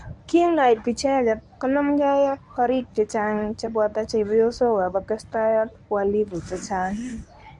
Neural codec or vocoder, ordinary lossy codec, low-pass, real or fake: codec, 24 kHz, 0.9 kbps, WavTokenizer, medium speech release version 1; none; 10.8 kHz; fake